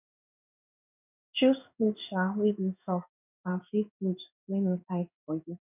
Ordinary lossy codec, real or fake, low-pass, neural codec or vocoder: none; fake; 3.6 kHz; codec, 16 kHz in and 24 kHz out, 1 kbps, XY-Tokenizer